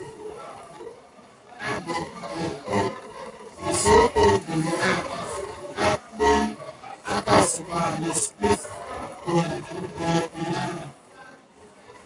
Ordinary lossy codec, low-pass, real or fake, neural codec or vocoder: AAC, 64 kbps; 10.8 kHz; fake; codec, 44.1 kHz, 7.8 kbps, Pupu-Codec